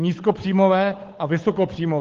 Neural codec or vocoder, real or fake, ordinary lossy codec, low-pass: codec, 16 kHz, 8 kbps, FunCodec, trained on Chinese and English, 25 frames a second; fake; Opus, 16 kbps; 7.2 kHz